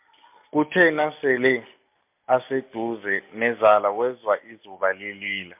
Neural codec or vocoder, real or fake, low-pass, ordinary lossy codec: none; real; 3.6 kHz; MP3, 32 kbps